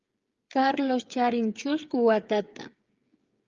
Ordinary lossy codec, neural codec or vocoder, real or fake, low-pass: Opus, 32 kbps; codec, 16 kHz, 8 kbps, FreqCodec, smaller model; fake; 7.2 kHz